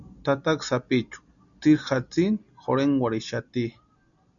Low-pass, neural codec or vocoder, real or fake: 7.2 kHz; none; real